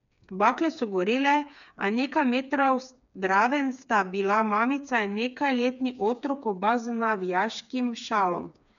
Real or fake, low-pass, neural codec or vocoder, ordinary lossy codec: fake; 7.2 kHz; codec, 16 kHz, 4 kbps, FreqCodec, smaller model; MP3, 96 kbps